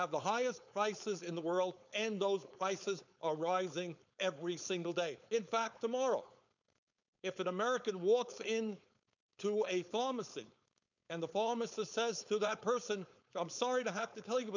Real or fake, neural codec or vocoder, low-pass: fake; codec, 16 kHz, 4.8 kbps, FACodec; 7.2 kHz